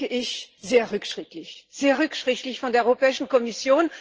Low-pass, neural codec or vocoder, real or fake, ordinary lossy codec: 7.2 kHz; vocoder, 44.1 kHz, 128 mel bands every 512 samples, BigVGAN v2; fake; Opus, 16 kbps